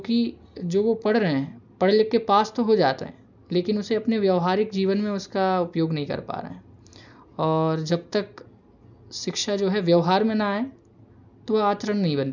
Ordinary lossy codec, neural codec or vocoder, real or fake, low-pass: none; none; real; 7.2 kHz